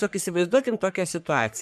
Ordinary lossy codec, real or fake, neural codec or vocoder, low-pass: MP3, 96 kbps; fake; codec, 44.1 kHz, 3.4 kbps, Pupu-Codec; 14.4 kHz